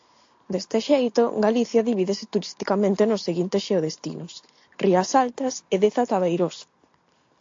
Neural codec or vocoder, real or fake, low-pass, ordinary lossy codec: none; real; 7.2 kHz; AAC, 48 kbps